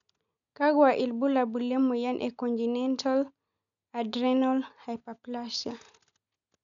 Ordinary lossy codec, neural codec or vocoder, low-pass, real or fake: none; none; 7.2 kHz; real